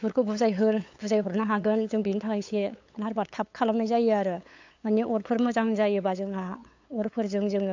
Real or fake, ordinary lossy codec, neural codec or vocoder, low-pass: fake; AAC, 48 kbps; codec, 16 kHz, 8 kbps, FunCodec, trained on LibriTTS, 25 frames a second; 7.2 kHz